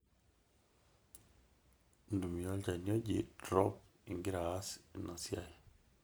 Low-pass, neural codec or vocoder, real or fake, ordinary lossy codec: none; none; real; none